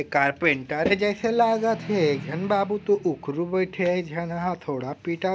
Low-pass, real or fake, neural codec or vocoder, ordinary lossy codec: none; real; none; none